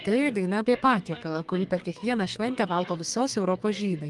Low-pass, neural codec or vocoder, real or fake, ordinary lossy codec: 10.8 kHz; codec, 32 kHz, 1.9 kbps, SNAC; fake; Opus, 32 kbps